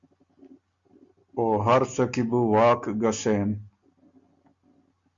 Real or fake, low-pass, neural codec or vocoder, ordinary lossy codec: real; 7.2 kHz; none; Opus, 64 kbps